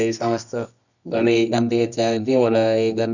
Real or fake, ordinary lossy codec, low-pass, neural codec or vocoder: fake; none; 7.2 kHz; codec, 24 kHz, 0.9 kbps, WavTokenizer, medium music audio release